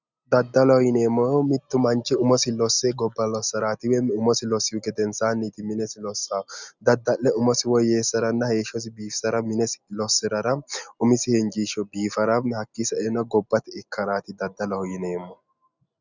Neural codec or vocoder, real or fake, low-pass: none; real; 7.2 kHz